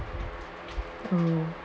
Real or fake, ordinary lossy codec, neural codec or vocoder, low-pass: real; none; none; none